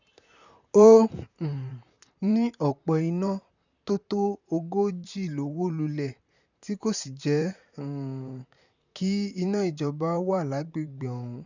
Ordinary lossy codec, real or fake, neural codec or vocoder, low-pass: none; fake; vocoder, 44.1 kHz, 128 mel bands, Pupu-Vocoder; 7.2 kHz